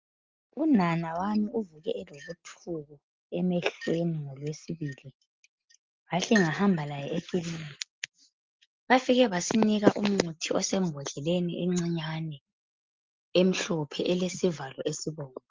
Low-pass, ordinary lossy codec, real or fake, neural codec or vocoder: 7.2 kHz; Opus, 32 kbps; real; none